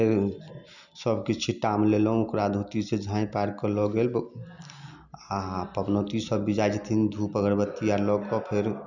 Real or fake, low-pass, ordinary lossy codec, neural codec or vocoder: real; 7.2 kHz; none; none